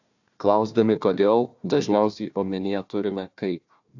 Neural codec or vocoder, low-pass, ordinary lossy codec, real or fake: codec, 16 kHz, 1 kbps, FunCodec, trained on Chinese and English, 50 frames a second; 7.2 kHz; AAC, 48 kbps; fake